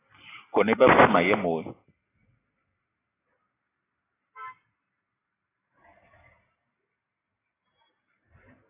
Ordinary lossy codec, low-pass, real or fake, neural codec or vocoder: AAC, 16 kbps; 3.6 kHz; real; none